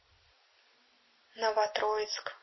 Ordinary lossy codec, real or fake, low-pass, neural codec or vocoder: MP3, 24 kbps; real; 7.2 kHz; none